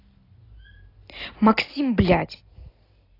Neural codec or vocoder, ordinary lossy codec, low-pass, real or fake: none; AAC, 24 kbps; 5.4 kHz; real